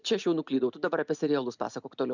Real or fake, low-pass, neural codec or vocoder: real; 7.2 kHz; none